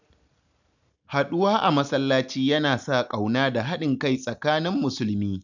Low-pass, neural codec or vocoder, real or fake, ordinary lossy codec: 7.2 kHz; none; real; none